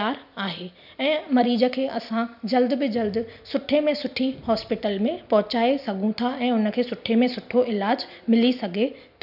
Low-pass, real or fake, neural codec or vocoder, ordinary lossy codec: 5.4 kHz; real; none; none